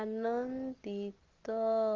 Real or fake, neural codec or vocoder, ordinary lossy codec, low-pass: real; none; Opus, 16 kbps; 7.2 kHz